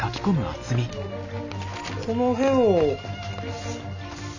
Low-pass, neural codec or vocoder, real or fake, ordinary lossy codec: 7.2 kHz; none; real; none